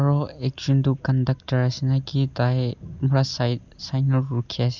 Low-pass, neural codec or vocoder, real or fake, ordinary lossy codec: 7.2 kHz; none; real; none